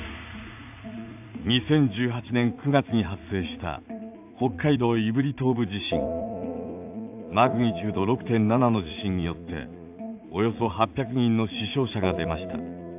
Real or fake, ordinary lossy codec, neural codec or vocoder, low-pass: fake; AAC, 32 kbps; vocoder, 44.1 kHz, 80 mel bands, Vocos; 3.6 kHz